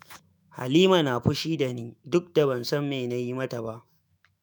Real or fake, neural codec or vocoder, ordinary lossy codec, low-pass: fake; autoencoder, 48 kHz, 128 numbers a frame, DAC-VAE, trained on Japanese speech; none; none